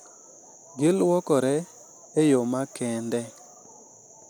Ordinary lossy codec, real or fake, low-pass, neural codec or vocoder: none; real; none; none